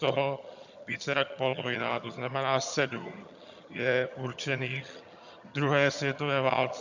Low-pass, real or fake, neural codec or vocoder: 7.2 kHz; fake; vocoder, 22.05 kHz, 80 mel bands, HiFi-GAN